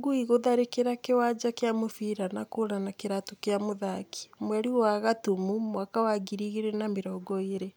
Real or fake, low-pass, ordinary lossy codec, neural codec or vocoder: real; none; none; none